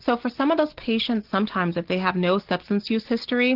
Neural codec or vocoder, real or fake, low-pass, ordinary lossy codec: none; real; 5.4 kHz; Opus, 16 kbps